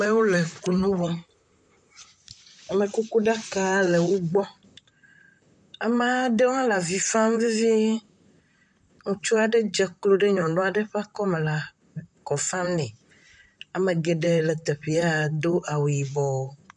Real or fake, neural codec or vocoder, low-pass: fake; vocoder, 44.1 kHz, 128 mel bands, Pupu-Vocoder; 10.8 kHz